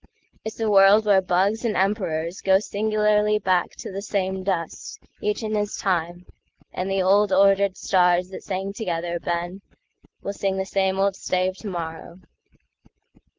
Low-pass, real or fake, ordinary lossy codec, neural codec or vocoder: 7.2 kHz; real; Opus, 16 kbps; none